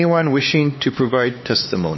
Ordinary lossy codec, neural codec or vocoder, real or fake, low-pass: MP3, 24 kbps; codec, 16 kHz, 4 kbps, X-Codec, HuBERT features, trained on LibriSpeech; fake; 7.2 kHz